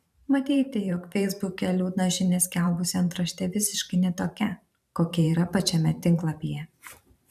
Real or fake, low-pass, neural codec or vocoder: fake; 14.4 kHz; vocoder, 44.1 kHz, 128 mel bands every 512 samples, BigVGAN v2